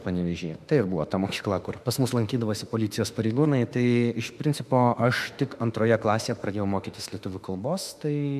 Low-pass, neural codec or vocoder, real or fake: 14.4 kHz; autoencoder, 48 kHz, 32 numbers a frame, DAC-VAE, trained on Japanese speech; fake